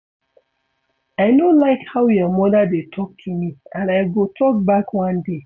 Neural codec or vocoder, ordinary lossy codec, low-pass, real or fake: none; none; 7.2 kHz; real